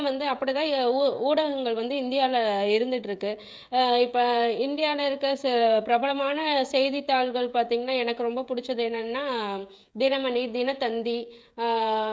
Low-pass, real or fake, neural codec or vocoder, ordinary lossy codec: none; fake; codec, 16 kHz, 16 kbps, FreqCodec, smaller model; none